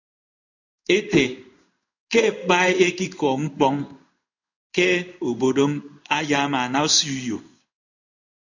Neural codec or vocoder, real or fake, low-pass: codec, 16 kHz in and 24 kHz out, 1 kbps, XY-Tokenizer; fake; 7.2 kHz